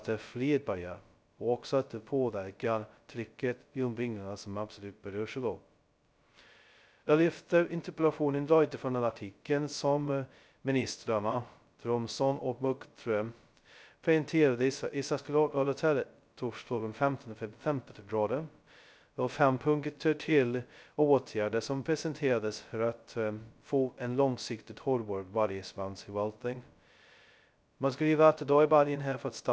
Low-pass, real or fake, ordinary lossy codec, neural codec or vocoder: none; fake; none; codec, 16 kHz, 0.2 kbps, FocalCodec